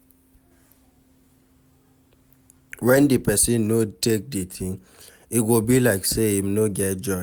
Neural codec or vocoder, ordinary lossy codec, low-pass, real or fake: none; none; none; real